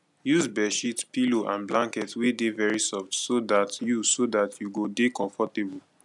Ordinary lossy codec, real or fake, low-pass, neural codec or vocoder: none; real; 10.8 kHz; none